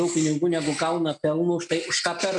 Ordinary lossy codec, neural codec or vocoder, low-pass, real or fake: MP3, 96 kbps; none; 10.8 kHz; real